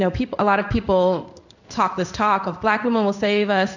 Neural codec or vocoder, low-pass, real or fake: codec, 16 kHz in and 24 kHz out, 1 kbps, XY-Tokenizer; 7.2 kHz; fake